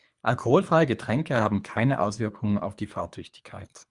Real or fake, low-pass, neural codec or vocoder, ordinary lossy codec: fake; 10.8 kHz; codec, 24 kHz, 3 kbps, HILCodec; Opus, 64 kbps